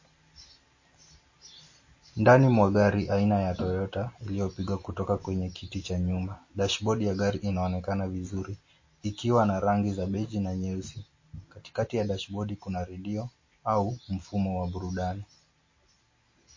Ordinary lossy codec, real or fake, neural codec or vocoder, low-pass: MP3, 32 kbps; real; none; 7.2 kHz